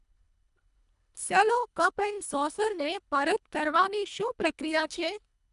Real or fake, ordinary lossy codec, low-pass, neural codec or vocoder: fake; none; 10.8 kHz; codec, 24 kHz, 1.5 kbps, HILCodec